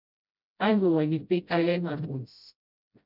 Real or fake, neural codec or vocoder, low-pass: fake; codec, 16 kHz, 0.5 kbps, FreqCodec, smaller model; 5.4 kHz